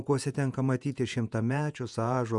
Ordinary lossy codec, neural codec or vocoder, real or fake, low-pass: MP3, 96 kbps; vocoder, 48 kHz, 128 mel bands, Vocos; fake; 10.8 kHz